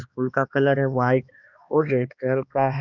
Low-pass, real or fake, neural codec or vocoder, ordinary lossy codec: 7.2 kHz; fake; codec, 16 kHz, 2 kbps, X-Codec, HuBERT features, trained on balanced general audio; none